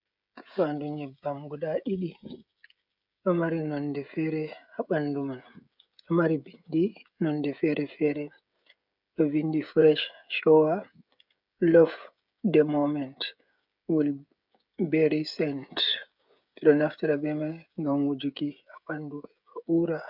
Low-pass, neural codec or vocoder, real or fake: 5.4 kHz; codec, 16 kHz, 16 kbps, FreqCodec, smaller model; fake